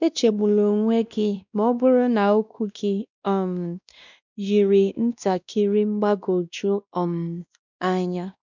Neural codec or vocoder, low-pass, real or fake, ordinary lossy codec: codec, 16 kHz, 1 kbps, X-Codec, WavLM features, trained on Multilingual LibriSpeech; 7.2 kHz; fake; none